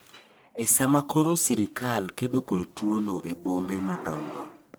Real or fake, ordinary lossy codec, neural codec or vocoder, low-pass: fake; none; codec, 44.1 kHz, 1.7 kbps, Pupu-Codec; none